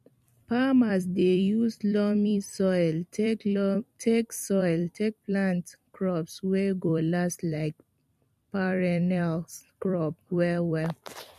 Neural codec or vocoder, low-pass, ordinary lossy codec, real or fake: vocoder, 44.1 kHz, 128 mel bands every 256 samples, BigVGAN v2; 14.4 kHz; MP3, 64 kbps; fake